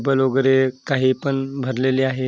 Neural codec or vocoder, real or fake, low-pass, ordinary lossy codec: none; real; none; none